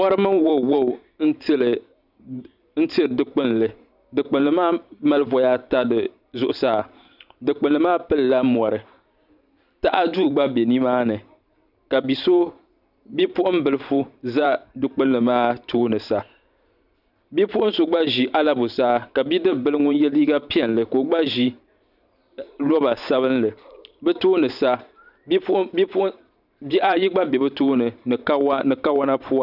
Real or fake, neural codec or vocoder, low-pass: fake; vocoder, 44.1 kHz, 128 mel bands every 512 samples, BigVGAN v2; 5.4 kHz